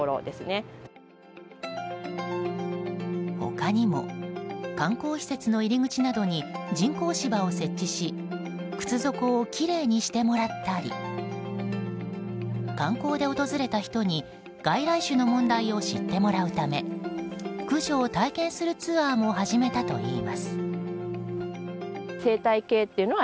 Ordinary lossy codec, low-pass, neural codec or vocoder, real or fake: none; none; none; real